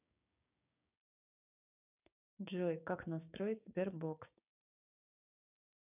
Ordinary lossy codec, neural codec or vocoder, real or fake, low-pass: none; codec, 16 kHz, 6 kbps, DAC; fake; 3.6 kHz